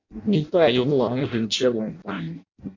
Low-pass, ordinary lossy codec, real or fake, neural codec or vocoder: 7.2 kHz; MP3, 48 kbps; fake; codec, 16 kHz in and 24 kHz out, 0.6 kbps, FireRedTTS-2 codec